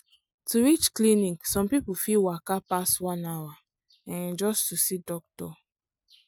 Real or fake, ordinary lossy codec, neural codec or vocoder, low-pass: real; none; none; none